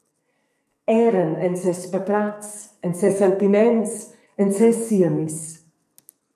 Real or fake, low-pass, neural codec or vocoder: fake; 14.4 kHz; codec, 44.1 kHz, 2.6 kbps, SNAC